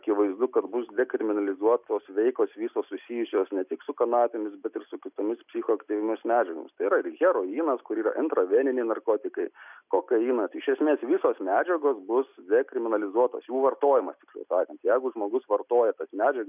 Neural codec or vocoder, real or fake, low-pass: none; real; 3.6 kHz